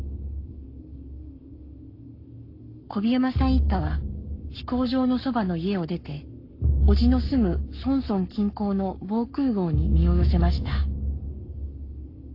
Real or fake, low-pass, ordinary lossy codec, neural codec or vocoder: fake; 5.4 kHz; AAC, 32 kbps; codec, 44.1 kHz, 7.8 kbps, Pupu-Codec